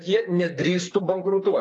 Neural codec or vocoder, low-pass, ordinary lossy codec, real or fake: codec, 32 kHz, 1.9 kbps, SNAC; 10.8 kHz; AAC, 48 kbps; fake